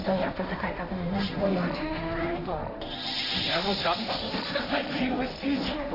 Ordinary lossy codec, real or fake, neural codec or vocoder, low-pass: none; fake; codec, 16 kHz, 1.1 kbps, Voila-Tokenizer; 5.4 kHz